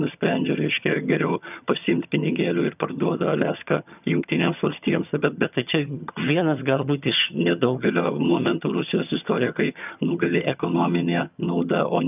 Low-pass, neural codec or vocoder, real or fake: 3.6 kHz; vocoder, 22.05 kHz, 80 mel bands, HiFi-GAN; fake